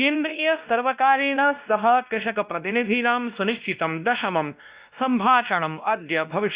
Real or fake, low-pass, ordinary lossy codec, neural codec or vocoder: fake; 3.6 kHz; Opus, 64 kbps; codec, 16 kHz, 1 kbps, X-Codec, WavLM features, trained on Multilingual LibriSpeech